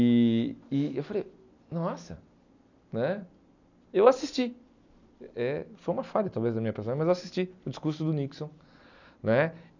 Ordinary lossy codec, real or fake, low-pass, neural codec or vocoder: none; real; 7.2 kHz; none